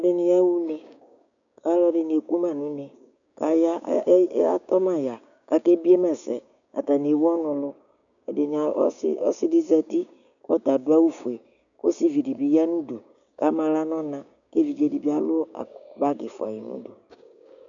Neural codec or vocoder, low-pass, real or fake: codec, 16 kHz, 6 kbps, DAC; 7.2 kHz; fake